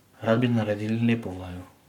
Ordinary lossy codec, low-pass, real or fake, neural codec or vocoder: MP3, 96 kbps; 19.8 kHz; fake; codec, 44.1 kHz, 7.8 kbps, Pupu-Codec